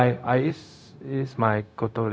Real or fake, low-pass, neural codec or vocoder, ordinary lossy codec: fake; none; codec, 16 kHz, 0.4 kbps, LongCat-Audio-Codec; none